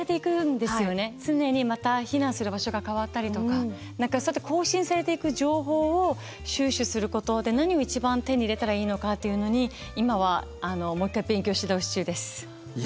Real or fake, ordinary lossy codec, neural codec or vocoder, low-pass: real; none; none; none